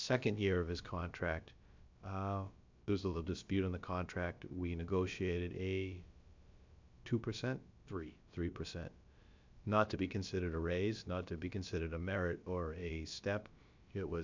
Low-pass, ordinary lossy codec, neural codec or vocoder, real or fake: 7.2 kHz; MP3, 64 kbps; codec, 16 kHz, about 1 kbps, DyCAST, with the encoder's durations; fake